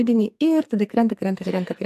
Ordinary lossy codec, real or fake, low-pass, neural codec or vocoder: AAC, 96 kbps; fake; 14.4 kHz; codec, 44.1 kHz, 2.6 kbps, SNAC